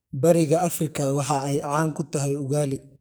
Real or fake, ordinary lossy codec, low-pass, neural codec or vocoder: fake; none; none; codec, 44.1 kHz, 2.6 kbps, SNAC